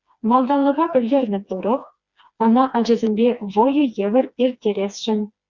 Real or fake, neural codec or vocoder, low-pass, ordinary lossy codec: fake; codec, 16 kHz, 2 kbps, FreqCodec, smaller model; 7.2 kHz; Opus, 64 kbps